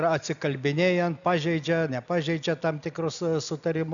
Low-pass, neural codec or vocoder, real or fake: 7.2 kHz; none; real